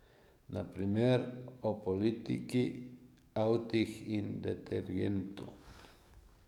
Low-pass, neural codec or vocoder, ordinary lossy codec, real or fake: 19.8 kHz; codec, 44.1 kHz, 7.8 kbps, DAC; none; fake